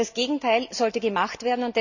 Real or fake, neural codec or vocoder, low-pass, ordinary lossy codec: real; none; 7.2 kHz; none